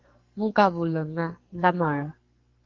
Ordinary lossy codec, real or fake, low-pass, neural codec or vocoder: Opus, 32 kbps; fake; 7.2 kHz; codec, 44.1 kHz, 2.6 kbps, SNAC